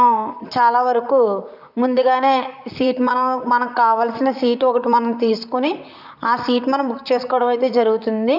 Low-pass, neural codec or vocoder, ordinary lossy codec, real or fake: 5.4 kHz; vocoder, 44.1 kHz, 128 mel bands, Pupu-Vocoder; none; fake